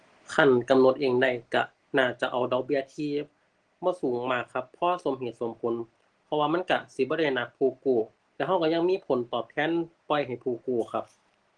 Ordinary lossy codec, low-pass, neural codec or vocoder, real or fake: Opus, 16 kbps; 9.9 kHz; none; real